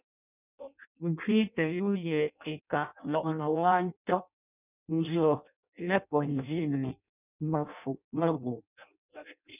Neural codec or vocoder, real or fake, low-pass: codec, 16 kHz in and 24 kHz out, 0.6 kbps, FireRedTTS-2 codec; fake; 3.6 kHz